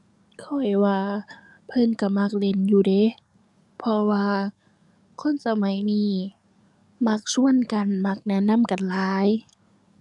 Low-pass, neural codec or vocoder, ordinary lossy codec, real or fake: 10.8 kHz; codec, 44.1 kHz, 7.8 kbps, DAC; none; fake